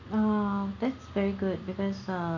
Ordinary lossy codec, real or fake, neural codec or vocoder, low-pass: none; real; none; 7.2 kHz